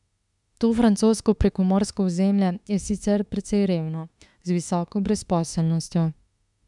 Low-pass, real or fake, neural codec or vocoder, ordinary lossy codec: 10.8 kHz; fake; autoencoder, 48 kHz, 32 numbers a frame, DAC-VAE, trained on Japanese speech; none